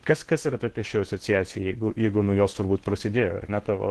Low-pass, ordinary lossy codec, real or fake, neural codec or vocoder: 10.8 kHz; Opus, 16 kbps; fake; codec, 16 kHz in and 24 kHz out, 0.8 kbps, FocalCodec, streaming, 65536 codes